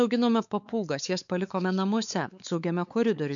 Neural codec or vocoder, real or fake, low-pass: codec, 16 kHz, 4 kbps, X-Codec, WavLM features, trained on Multilingual LibriSpeech; fake; 7.2 kHz